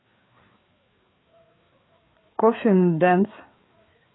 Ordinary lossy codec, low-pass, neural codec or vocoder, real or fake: AAC, 16 kbps; 7.2 kHz; codec, 16 kHz, 4 kbps, FreqCodec, larger model; fake